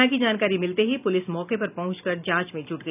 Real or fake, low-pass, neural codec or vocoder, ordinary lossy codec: real; 3.6 kHz; none; none